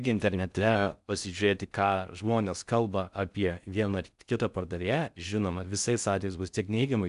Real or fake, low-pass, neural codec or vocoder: fake; 10.8 kHz; codec, 16 kHz in and 24 kHz out, 0.6 kbps, FocalCodec, streaming, 4096 codes